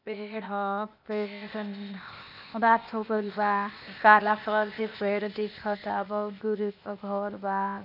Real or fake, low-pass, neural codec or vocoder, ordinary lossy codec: fake; 5.4 kHz; codec, 16 kHz, 0.8 kbps, ZipCodec; none